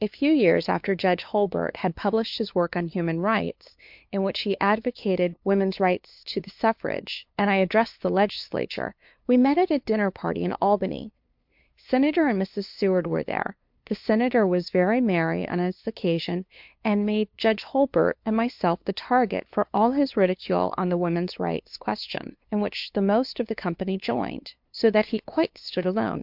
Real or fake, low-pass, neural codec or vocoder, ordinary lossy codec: fake; 5.4 kHz; codec, 16 kHz, 2 kbps, FunCodec, trained on LibriTTS, 25 frames a second; MP3, 48 kbps